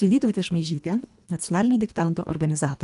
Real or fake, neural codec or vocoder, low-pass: fake; codec, 24 kHz, 1.5 kbps, HILCodec; 10.8 kHz